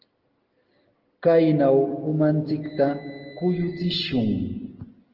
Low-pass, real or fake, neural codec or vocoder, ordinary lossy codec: 5.4 kHz; real; none; Opus, 16 kbps